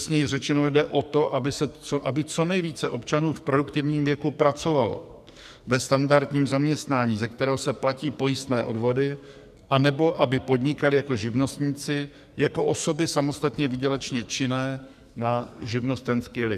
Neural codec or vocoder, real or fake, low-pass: codec, 44.1 kHz, 2.6 kbps, SNAC; fake; 14.4 kHz